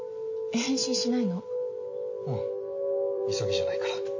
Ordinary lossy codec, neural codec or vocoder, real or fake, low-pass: MP3, 32 kbps; none; real; 7.2 kHz